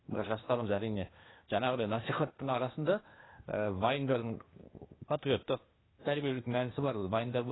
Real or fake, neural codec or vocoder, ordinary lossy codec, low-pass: fake; codec, 16 kHz, 0.8 kbps, ZipCodec; AAC, 16 kbps; 7.2 kHz